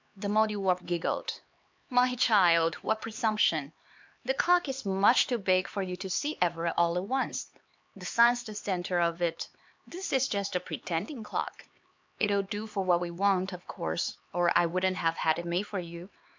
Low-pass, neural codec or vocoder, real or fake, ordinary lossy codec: 7.2 kHz; codec, 16 kHz, 4 kbps, X-Codec, HuBERT features, trained on LibriSpeech; fake; MP3, 64 kbps